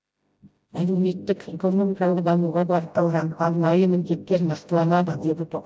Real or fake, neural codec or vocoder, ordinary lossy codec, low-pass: fake; codec, 16 kHz, 0.5 kbps, FreqCodec, smaller model; none; none